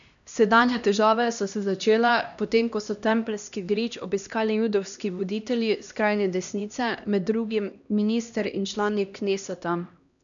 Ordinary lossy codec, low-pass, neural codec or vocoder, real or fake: none; 7.2 kHz; codec, 16 kHz, 1 kbps, X-Codec, HuBERT features, trained on LibriSpeech; fake